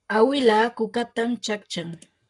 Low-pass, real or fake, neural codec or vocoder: 10.8 kHz; fake; codec, 44.1 kHz, 7.8 kbps, Pupu-Codec